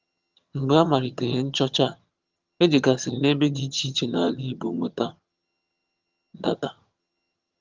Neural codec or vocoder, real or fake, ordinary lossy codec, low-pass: vocoder, 22.05 kHz, 80 mel bands, HiFi-GAN; fake; Opus, 32 kbps; 7.2 kHz